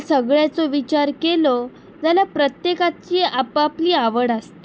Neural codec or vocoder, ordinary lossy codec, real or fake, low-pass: none; none; real; none